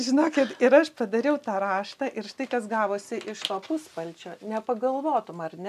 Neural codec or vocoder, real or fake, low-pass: none; real; 14.4 kHz